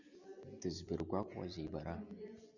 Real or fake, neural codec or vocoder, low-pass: real; none; 7.2 kHz